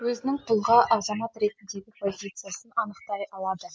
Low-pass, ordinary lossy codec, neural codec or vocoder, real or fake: none; none; none; real